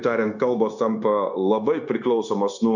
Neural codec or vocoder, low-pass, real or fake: codec, 16 kHz, 0.9 kbps, LongCat-Audio-Codec; 7.2 kHz; fake